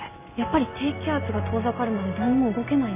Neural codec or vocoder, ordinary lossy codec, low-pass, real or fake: none; AAC, 24 kbps; 3.6 kHz; real